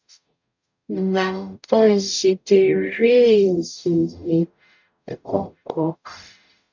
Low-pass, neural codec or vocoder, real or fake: 7.2 kHz; codec, 44.1 kHz, 0.9 kbps, DAC; fake